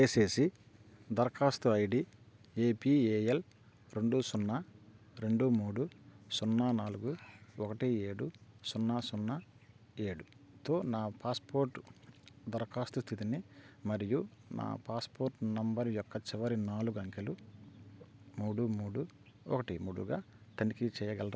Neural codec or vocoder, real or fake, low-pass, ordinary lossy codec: none; real; none; none